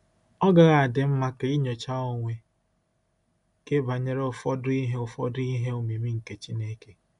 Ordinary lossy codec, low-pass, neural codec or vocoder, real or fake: none; 10.8 kHz; none; real